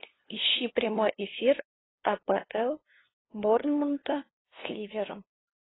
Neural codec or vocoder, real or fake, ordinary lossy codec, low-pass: codec, 16 kHz, 2 kbps, FunCodec, trained on LibriTTS, 25 frames a second; fake; AAC, 16 kbps; 7.2 kHz